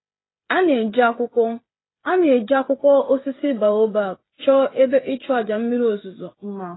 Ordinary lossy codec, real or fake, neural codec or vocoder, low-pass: AAC, 16 kbps; fake; codec, 16 kHz, 8 kbps, FreqCodec, smaller model; 7.2 kHz